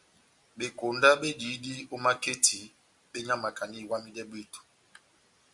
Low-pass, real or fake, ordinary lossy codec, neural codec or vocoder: 10.8 kHz; real; Opus, 64 kbps; none